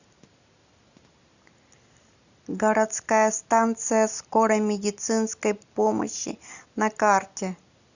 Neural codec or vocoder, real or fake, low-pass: none; real; 7.2 kHz